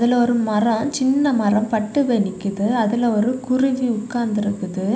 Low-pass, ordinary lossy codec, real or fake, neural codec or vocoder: none; none; real; none